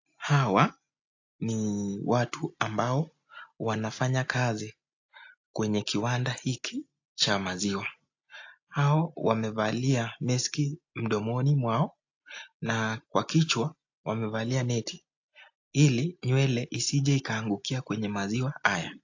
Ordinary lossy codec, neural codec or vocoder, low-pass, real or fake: AAC, 48 kbps; none; 7.2 kHz; real